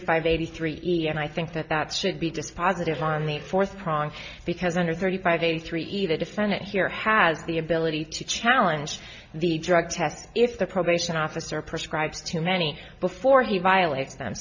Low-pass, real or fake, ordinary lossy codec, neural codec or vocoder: 7.2 kHz; real; MP3, 48 kbps; none